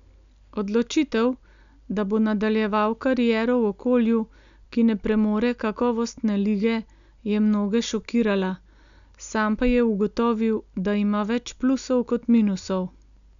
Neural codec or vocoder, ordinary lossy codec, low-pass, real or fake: none; none; 7.2 kHz; real